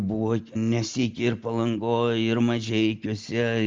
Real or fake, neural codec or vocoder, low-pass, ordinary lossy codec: real; none; 7.2 kHz; Opus, 32 kbps